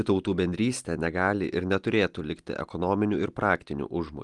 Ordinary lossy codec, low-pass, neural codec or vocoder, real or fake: Opus, 24 kbps; 10.8 kHz; none; real